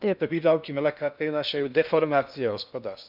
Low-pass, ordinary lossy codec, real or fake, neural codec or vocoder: 5.4 kHz; none; fake; codec, 16 kHz in and 24 kHz out, 0.6 kbps, FocalCodec, streaming, 2048 codes